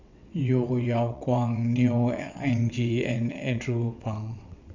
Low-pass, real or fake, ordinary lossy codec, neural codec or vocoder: 7.2 kHz; fake; none; vocoder, 22.05 kHz, 80 mel bands, WaveNeXt